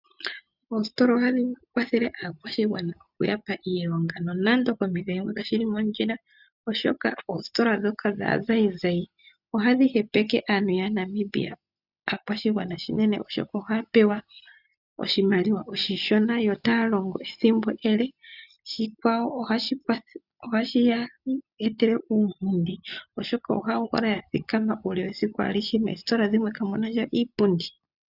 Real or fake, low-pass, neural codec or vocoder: real; 5.4 kHz; none